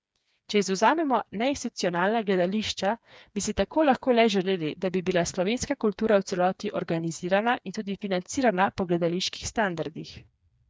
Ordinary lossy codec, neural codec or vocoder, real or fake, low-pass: none; codec, 16 kHz, 4 kbps, FreqCodec, smaller model; fake; none